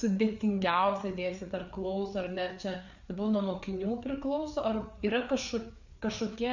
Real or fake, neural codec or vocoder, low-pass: fake; codec, 16 kHz, 4 kbps, FreqCodec, larger model; 7.2 kHz